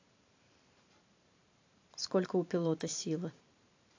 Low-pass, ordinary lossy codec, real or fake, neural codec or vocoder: 7.2 kHz; AAC, 48 kbps; fake; vocoder, 44.1 kHz, 128 mel bands every 512 samples, BigVGAN v2